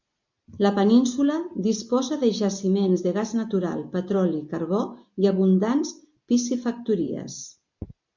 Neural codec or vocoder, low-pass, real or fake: none; 7.2 kHz; real